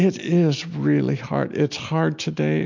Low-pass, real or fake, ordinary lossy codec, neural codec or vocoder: 7.2 kHz; real; MP3, 48 kbps; none